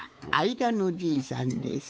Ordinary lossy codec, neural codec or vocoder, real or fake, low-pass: none; codec, 16 kHz, 4 kbps, X-Codec, WavLM features, trained on Multilingual LibriSpeech; fake; none